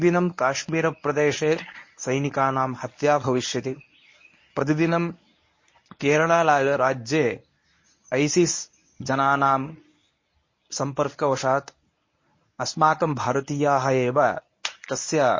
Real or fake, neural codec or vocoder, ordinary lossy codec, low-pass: fake; codec, 24 kHz, 0.9 kbps, WavTokenizer, medium speech release version 1; MP3, 32 kbps; 7.2 kHz